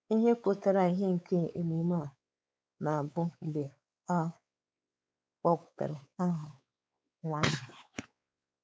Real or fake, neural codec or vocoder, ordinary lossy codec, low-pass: fake; codec, 16 kHz, 4 kbps, X-Codec, WavLM features, trained on Multilingual LibriSpeech; none; none